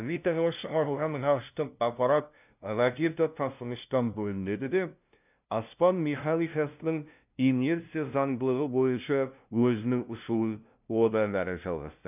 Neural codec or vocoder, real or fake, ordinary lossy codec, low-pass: codec, 16 kHz, 0.5 kbps, FunCodec, trained on LibriTTS, 25 frames a second; fake; none; 3.6 kHz